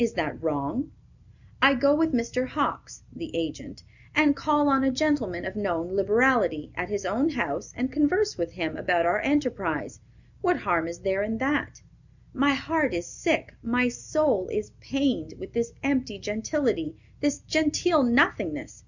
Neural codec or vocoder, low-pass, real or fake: none; 7.2 kHz; real